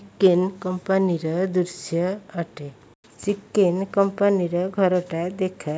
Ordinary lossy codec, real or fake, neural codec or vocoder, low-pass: none; real; none; none